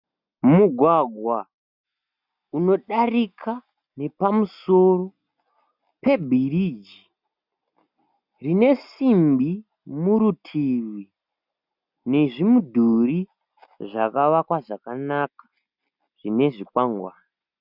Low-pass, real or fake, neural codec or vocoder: 5.4 kHz; real; none